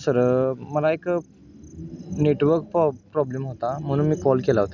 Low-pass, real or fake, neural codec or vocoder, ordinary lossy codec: 7.2 kHz; real; none; none